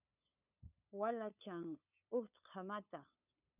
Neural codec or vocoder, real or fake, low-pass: codec, 16 kHz, 8 kbps, FunCodec, trained on LibriTTS, 25 frames a second; fake; 3.6 kHz